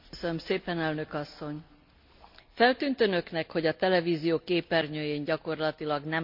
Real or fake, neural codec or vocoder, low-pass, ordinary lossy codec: real; none; 5.4 kHz; MP3, 48 kbps